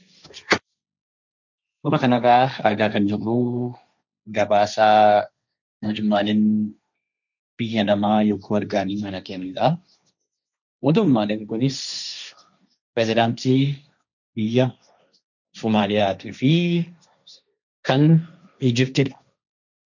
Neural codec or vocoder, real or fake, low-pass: codec, 16 kHz, 1.1 kbps, Voila-Tokenizer; fake; 7.2 kHz